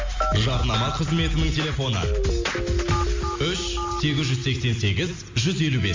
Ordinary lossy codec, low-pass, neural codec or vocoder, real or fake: MP3, 48 kbps; 7.2 kHz; none; real